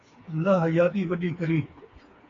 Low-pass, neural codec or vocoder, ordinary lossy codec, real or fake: 7.2 kHz; codec, 16 kHz, 4 kbps, FreqCodec, smaller model; AAC, 32 kbps; fake